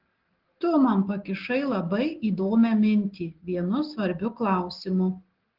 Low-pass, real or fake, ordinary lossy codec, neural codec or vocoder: 5.4 kHz; real; Opus, 16 kbps; none